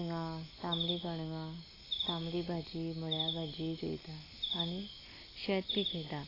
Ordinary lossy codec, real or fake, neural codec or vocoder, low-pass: AAC, 24 kbps; real; none; 5.4 kHz